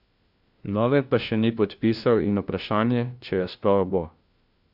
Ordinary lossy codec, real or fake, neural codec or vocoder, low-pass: none; fake; codec, 16 kHz, 1 kbps, FunCodec, trained on LibriTTS, 50 frames a second; 5.4 kHz